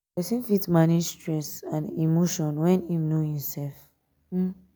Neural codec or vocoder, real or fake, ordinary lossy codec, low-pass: none; real; none; none